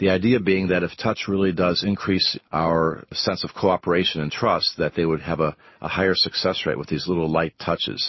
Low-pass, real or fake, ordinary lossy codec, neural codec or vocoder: 7.2 kHz; real; MP3, 24 kbps; none